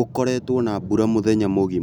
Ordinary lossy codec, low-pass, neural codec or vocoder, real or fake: none; 19.8 kHz; none; real